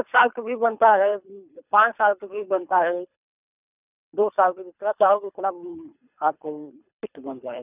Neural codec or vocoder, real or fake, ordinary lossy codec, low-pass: codec, 24 kHz, 3 kbps, HILCodec; fake; none; 3.6 kHz